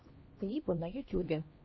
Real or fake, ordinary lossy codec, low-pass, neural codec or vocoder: fake; MP3, 24 kbps; 7.2 kHz; codec, 16 kHz, 1 kbps, X-Codec, HuBERT features, trained on LibriSpeech